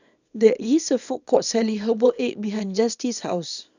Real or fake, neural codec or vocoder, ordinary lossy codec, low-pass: fake; codec, 24 kHz, 0.9 kbps, WavTokenizer, small release; none; 7.2 kHz